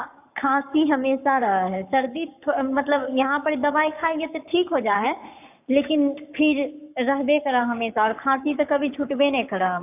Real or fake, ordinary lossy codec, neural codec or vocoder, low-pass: fake; none; codec, 44.1 kHz, 7.8 kbps, Pupu-Codec; 3.6 kHz